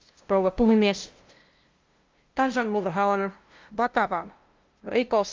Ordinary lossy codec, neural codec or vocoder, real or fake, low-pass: Opus, 32 kbps; codec, 16 kHz, 0.5 kbps, FunCodec, trained on LibriTTS, 25 frames a second; fake; 7.2 kHz